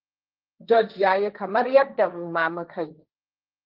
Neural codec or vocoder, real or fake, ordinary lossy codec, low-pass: codec, 16 kHz, 1.1 kbps, Voila-Tokenizer; fake; Opus, 32 kbps; 5.4 kHz